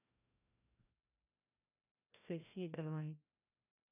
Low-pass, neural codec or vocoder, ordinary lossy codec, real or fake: 3.6 kHz; codec, 16 kHz, 1 kbps, FreqCodec, larger model; none; fake